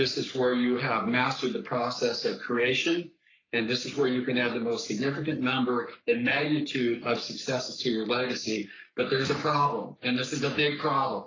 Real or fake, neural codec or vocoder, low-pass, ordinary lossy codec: fake; codec, 44.1 kHz, 3.4 kbps, Pupu-Codec; 7.2 kHz; AAC, 32 kbps